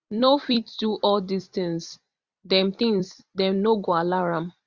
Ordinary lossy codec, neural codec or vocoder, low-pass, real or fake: Opus, 64 kbps; vocoder, 44.1 kHz, 128 mel bands every 256 samples, BigVGAN v2; 7.2 kHz; fake